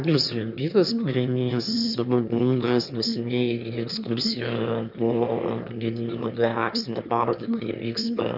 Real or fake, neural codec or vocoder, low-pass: fake; autoencoder, 22.05 kHz, a latent of 192 numbers a frame, VITS, trained on one speaker; 5.4 kHz